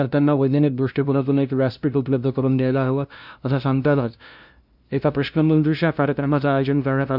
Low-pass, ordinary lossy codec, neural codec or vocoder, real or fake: 5.4 kHz; none; codec, 16 kHz, 0.5 kbps, FunCodec, trained on LibriTTS, 25 frames a second; fake